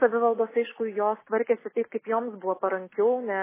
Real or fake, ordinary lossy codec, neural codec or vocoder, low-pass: real; MP3, 16 kbps; none; 3.6 kHz